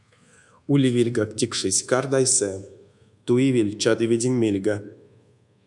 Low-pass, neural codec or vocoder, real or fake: 10.8 kHz; codec, 24 kHz, 1.2 kbps, DualCodec; fake